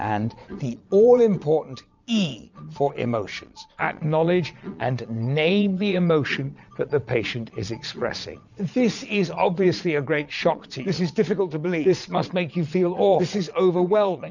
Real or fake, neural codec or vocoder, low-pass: fake; vocoder, 22.05 kHz, 80 mel bands, Vocos; 7.2 kHz